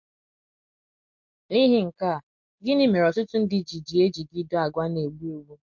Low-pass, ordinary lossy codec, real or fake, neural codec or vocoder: 7.2 kHz; MP3, 48 kbps; real; none